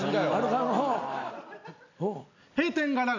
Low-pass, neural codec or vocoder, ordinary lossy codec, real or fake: 7.2 kHz; none; none; real